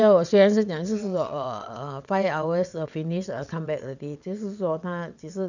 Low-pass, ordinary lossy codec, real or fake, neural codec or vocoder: 7.2 kHz; none; fake; vocoder, 22.05 kHz, 80 mel bands, WaveNeXt